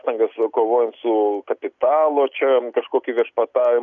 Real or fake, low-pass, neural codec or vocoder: real; 7.2 kHz; none